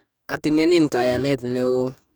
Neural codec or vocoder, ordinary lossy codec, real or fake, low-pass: codec, 44.1 kHz, 2.6 kbps, DAC; none; fake; none